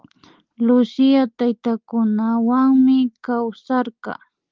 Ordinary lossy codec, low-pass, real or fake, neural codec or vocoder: Opus, 32 kbps; 7.2 kHz; real; none